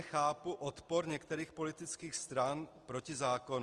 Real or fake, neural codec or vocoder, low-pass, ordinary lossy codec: real; none; 10.8 kHz; Opus, 24 kbps